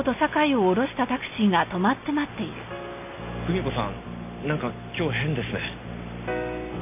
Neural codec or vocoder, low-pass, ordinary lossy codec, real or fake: none; 3.6 kHz; none; real